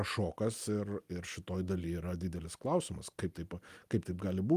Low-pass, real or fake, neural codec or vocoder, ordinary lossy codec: 14.4 kHz; real; none; Opus, 32 kbps